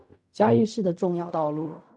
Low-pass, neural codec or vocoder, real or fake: 10.8 kHz; codec, 16 kHz in and 24 kHz out, 0.4 kbps, LongCat-Audio-Codec, fine tuned four codebook decoder; fake